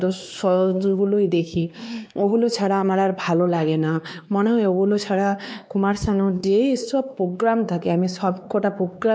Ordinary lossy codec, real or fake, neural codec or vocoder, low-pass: none; fake; codec, 16 kHz, 2 kbps, X-Codec, WavLM features, trained on Multilingual LibriSpeech; none